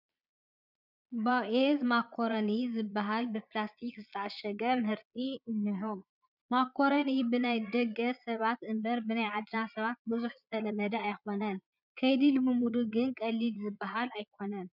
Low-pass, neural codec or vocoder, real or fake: 5.4 kHz; vocoder, 22.05 kHz, 80 mel bands, Vocos; fake